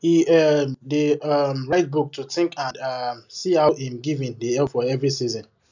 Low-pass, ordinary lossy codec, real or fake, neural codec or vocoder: 7.2 kHz; none; real; none